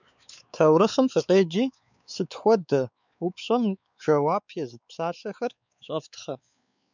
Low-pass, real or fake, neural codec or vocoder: 7.2 kHz; fake; codec, 16 kHz, 4 kbps, X-Codec, WavLM features, trained on Multilingual LibriSpeech